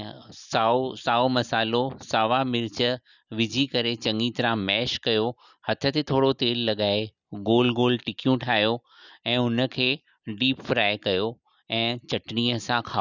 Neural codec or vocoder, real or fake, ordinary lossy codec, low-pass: none; real; none; 7.2 kHz